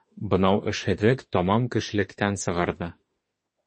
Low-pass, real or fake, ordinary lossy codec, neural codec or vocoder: 10.8 kHz; fake; MP3, 32 kbps; autoencoder, 48 kHz, 32 numbers a frame, DAC-VAE, trained on Japanese speech